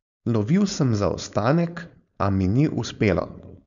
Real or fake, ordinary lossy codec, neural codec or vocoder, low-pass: fake; none; codec, 16 kHz, 4.8 kbps, FACodec; 7.2 kHz